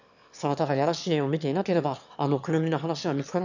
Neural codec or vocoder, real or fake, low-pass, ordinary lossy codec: autoencoder, 22.05 kHz, a latent of 192 numbers a frame, VITS, trained on one speaker; fake; 7.2 kHz; none